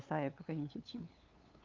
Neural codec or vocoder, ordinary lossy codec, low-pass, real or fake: codec, 16 kHz, 8 kbps, FunCodec, trained on LibriTTS, 25 frames a second; Opus, 32 kbps; 7.2 kHz; fake